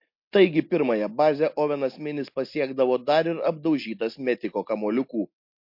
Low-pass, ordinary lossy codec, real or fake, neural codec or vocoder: 5.4 kHz; MP3, 32 kbps; real; none